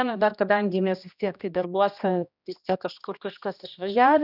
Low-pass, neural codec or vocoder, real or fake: 5.4 kHz; codec, 16 kHz, 1 kbps, X-Codec, HuBERT features, trained on general audio; fake